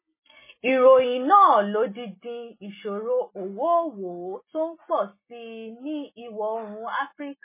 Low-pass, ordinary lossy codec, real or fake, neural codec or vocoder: 3.6 kHz; MP3, 16 kbps; real; none